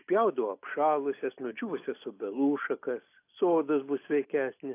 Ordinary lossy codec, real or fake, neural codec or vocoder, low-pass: AAC, 24 kbps; real; none; 3.6 kHz